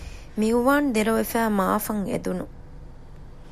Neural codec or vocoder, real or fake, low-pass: none; real; 14.4 kHz